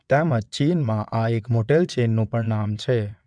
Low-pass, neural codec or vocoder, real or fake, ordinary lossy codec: 9.9 kHz; vocoder, 22.05 kHz, 80 mel bands, WaveNeXt; fake; none